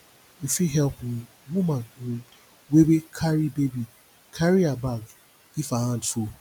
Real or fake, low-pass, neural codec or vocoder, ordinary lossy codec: real; none; none; none